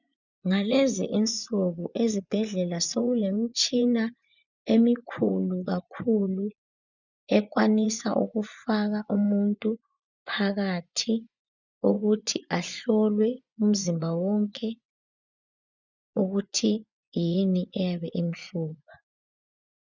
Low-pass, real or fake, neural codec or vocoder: 7.2 kHz; fake; vocoder, 24 kHz, 100 mel bands, Vocos